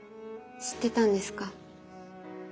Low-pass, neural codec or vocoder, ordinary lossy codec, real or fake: none; none; none; real